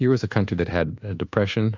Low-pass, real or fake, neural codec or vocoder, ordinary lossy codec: 7.2 kHz; fake; codec, 24 kHz, 1.2 kbps, DualCodec; AAC, 48 kbps